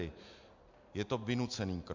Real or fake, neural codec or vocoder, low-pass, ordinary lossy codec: real; none; 7.2 kHz; MP3, 64 kbps